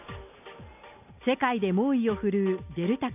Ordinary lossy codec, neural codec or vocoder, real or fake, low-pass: none; none; real; 3.6 kHz